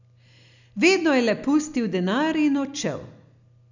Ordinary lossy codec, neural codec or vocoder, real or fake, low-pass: none; none; real; 7.2 kHz